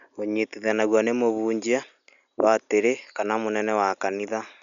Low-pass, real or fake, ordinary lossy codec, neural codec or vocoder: 7.2 kHz; real; none; none